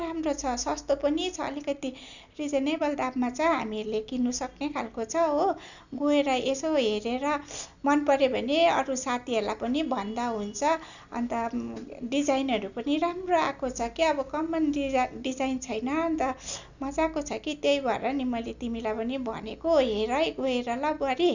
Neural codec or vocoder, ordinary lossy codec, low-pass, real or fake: none; none; 7.2 kHz; real